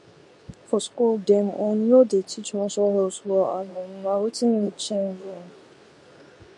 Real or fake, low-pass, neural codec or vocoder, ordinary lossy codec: fake; 10.8 kHz; codec, 24 kHz, 0.9 kbps, WavTokenizer, medium speech release version 2; none